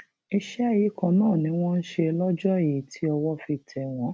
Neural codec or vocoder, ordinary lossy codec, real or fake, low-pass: none; none; real; none